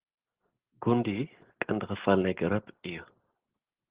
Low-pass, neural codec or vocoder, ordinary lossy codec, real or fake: 3.6 kHz; none; Opus, 16 kbps; real